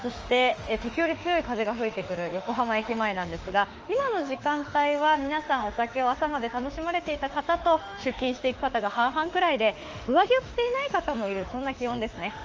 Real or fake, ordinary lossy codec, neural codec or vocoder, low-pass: fake; Opus, 24 kbps; autoencoder, 48 kHz, 32 numbers a frame, DAC-VAE, trained on Japanese speech; 7.2 kHz